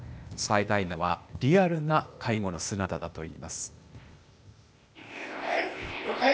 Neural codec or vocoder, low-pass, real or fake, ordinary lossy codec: codec, 16 kHz, 0.8 kbps, ZipCodec; none; fake; none